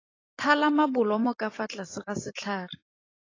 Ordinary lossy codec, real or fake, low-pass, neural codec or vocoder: AAC, 32 kbps; fake; 7.2 kHz; vocoder, 44.1 kHz, 128 mel bands every 256 samples, BigVGAN v2